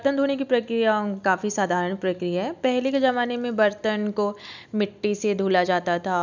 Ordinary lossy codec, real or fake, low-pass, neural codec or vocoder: none; real; 7.2 kHz; none